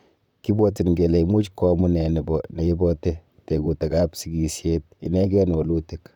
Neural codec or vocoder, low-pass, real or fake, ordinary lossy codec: vocoder, 44.1 kHz, 128 mel bands, Pupu-Vocoder; 19.8 kHz; fake; none